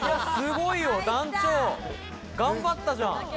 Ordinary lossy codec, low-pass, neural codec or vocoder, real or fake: none; none; none; real